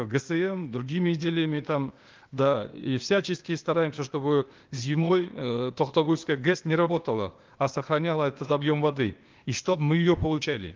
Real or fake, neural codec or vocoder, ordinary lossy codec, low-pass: fake; codec, 16 kHz, 0.8 kbps, ZipCodec; Opus, 32 kbps; 7.2 kHz